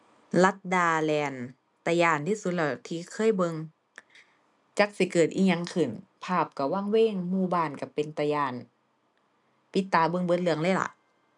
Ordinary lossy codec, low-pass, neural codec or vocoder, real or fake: none; 10.8 kHz; none; real